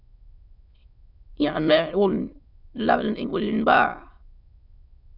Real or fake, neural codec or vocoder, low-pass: fake; autoencoder, 22.05 kHz, a latent of 192 numbers a frame, VITS, trained on many speakers; 5.4 kHz